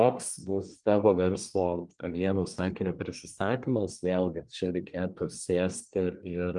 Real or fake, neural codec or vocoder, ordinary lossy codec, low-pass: fake; codec, 24 kHz, 1 kbps, SNAC; Opus, 32 kbps; 10.8 kHz